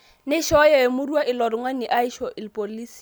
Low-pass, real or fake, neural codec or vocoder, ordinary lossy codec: none; real; none; none